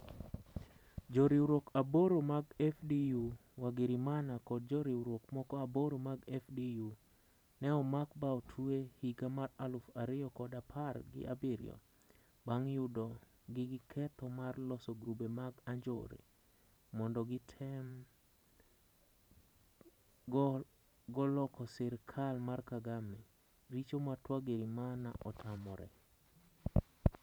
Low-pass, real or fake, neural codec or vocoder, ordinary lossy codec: none; fake; vocoder, 44.1 kHz, 128 mel bands every 512 samples, BigVGAN v2; none